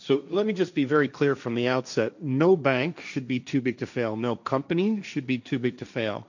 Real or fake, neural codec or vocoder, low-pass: fake; codec, 16 kHz, 1.1 kbps, Voila-Tokenizer; 7.2 kHz